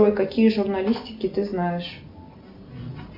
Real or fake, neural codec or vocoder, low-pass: real; none; 5.4 kHz